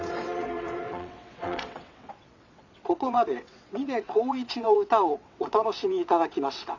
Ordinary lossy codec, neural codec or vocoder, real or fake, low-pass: none; vocoder, 22.05 kHz, 80 mel bands, WaveNeXt; fake; 7.2 kHz